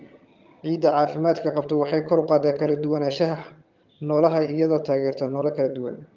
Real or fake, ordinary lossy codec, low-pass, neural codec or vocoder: fake; Opus, 24 kbps; 7.2 kHz; vocoder, 22.05 kHz, 80 mel bands, HiFi-GAN